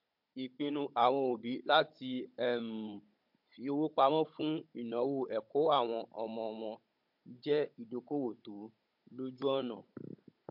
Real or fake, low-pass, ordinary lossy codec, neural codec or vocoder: fake; 5.4 kHz; MP3, 48 kbps; codec, 16 kHz, 16 kbps, FunCodec, trained on Chinese and English, 50 frames a second